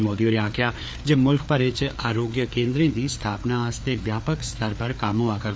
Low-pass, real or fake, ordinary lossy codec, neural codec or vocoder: none; fake; none; codec, 16 kHz, 4 kbps, FreqCodec, larger model